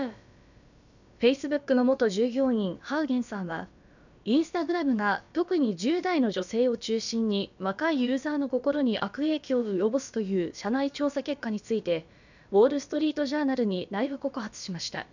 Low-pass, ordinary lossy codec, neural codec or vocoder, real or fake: 7.2 kHz; none; codec, 16 kHz, about 1 kbps, DyCAST, with the encoder's durations; fake